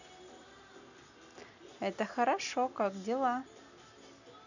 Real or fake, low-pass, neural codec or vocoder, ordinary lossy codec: real; 7.2 kHz; none; none